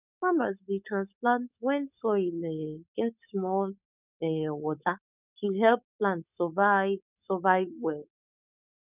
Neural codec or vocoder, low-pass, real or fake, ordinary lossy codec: codec, 16 kHz, 4.8 kbps, FACodec; 3.6 kHz; fake; none